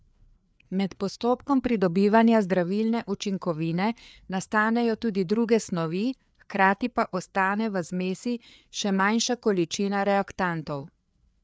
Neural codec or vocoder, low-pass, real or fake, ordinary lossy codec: codec, 16 kHz, 4 kbps, FreqCodec, larger model; none; fake; none